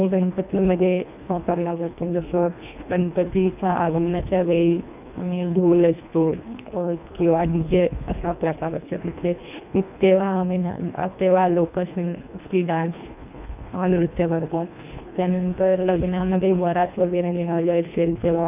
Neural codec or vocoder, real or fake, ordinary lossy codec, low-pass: codec, 24 kHz, 1.5 kbps, HILCodec; fake; none; 3.6 kHz